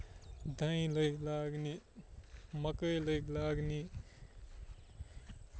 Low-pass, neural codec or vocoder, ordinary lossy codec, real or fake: none; none; none; real